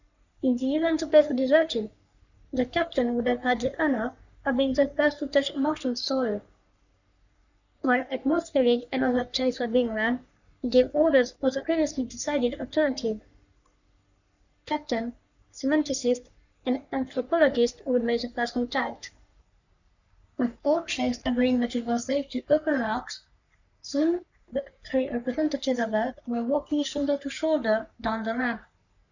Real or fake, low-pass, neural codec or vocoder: fake; 7.2 kHz; codec, 44.1 kHz, 3.4 kbps, Pupu-Codec